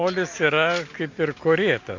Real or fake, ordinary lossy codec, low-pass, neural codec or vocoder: real; MP3, 48 kbps; 7.2 kHz; none